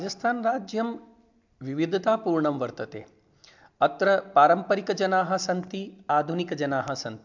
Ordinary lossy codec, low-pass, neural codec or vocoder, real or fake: MP3, 64 kbps; 7.2 kHz; none; real